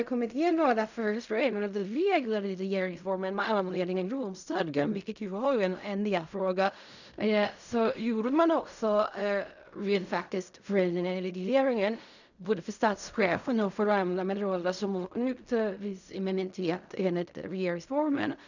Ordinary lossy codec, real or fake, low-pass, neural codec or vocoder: none; fake; 7.2 kHz; codec, 16 kHz in and 24 kHz out, 0.4 kbps, LongCat-Audio-Codec, fine tuned four codebook decoder